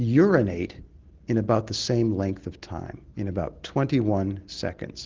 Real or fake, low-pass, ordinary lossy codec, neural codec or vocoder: real; 7.2 kHz; Opus, 16 kbps; none